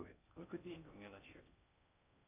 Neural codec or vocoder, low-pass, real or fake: codec, 16 kHz in and 24 kHz out, 0.6 kbps, FocalCodec, streaming, 4096 codes; 3.6 kHz; fake